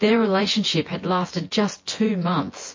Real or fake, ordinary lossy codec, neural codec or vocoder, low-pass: fake; MP3, 32 kbps; vocoder, 24 kHz, 100 mel bands, Vocos; 7.2 kHz